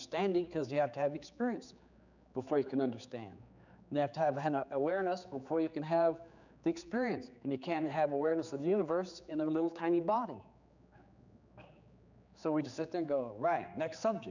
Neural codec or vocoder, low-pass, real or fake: codec, 16 kHz, 4 kbps, X-Codec, HuBERT features, trained on general audio; 7.2 kHz; fake